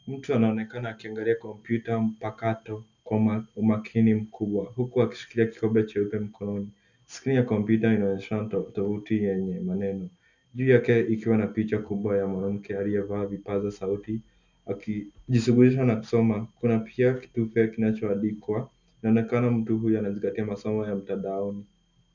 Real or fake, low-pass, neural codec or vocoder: real; 7.2 kHz; none